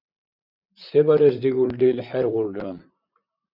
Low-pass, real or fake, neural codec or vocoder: 5.4 kHz; fake; vocoder, 44.1 kHz, 128 mel bands, Pupu-Vocoder